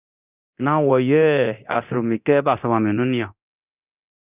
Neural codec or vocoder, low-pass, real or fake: codec, 24 kHz, 0.9 kbps, DualCodec; 3.6 kHz; fake